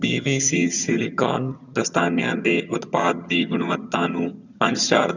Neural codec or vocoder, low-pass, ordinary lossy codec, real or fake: vocoder, 22.05 kHz, 80 mel bands, HiFi-GAN; 7.2 kHz; none; fake